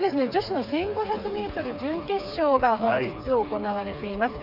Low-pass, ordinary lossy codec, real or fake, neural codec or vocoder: 5.4 kHz; none; fake; codec, 16 kHz, 4 kbps, FreqCodec, smaller model